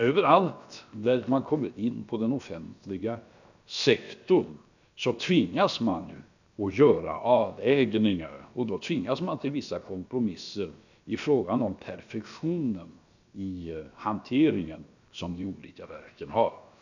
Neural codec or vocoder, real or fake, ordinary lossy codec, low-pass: codec, 16 kHz, about 1 kbps, DyCAST, with the encoder's durations; fake; none; 7.2 kHz